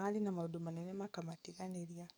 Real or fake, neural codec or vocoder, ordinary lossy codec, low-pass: fake; codec, 44.1 kHz, 7.8 kbps, DAC; none; none